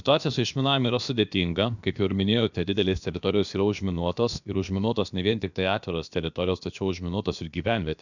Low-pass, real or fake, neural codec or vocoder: 7.2 kHz; fake; codec, 16 kHz, about 1 kbps, DyCAST, with the encoder's durations